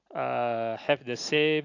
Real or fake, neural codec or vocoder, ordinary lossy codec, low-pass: real; none; AAC, 48 kbps; 7.2 kHz